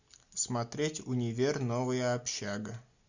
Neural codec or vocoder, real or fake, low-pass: none; real; 7.2 kHz